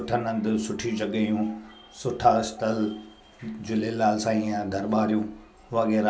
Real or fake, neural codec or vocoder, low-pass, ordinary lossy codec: real; none; none; none